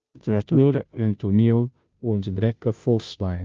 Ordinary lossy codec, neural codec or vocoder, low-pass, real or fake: Opus, 24 kbps; codec, 16 kHz, 0.5 kbps, FunCodec, trained on Chinese and English, 25 frames a second; 7.2 kHz; fake